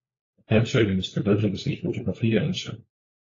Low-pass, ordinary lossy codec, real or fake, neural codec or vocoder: 7.2 kHz; AAC, 32 kbps; fake; codec, 16 kHz, 4 kbps, FunCodec, trained on LibriTTS, 50 frames a second